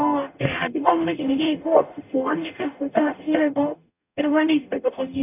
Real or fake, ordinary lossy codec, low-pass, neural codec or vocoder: fake; none; 3.6 kHz; codec, 44.1 kHz, 0.9 kbps, DAC